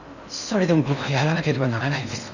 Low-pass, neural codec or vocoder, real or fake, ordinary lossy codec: 7.2 kHz; codec, 16 kHz in and 24 kHz out, 0.6 kbps, FocalCodec, streaming, 4096 codes; fake; none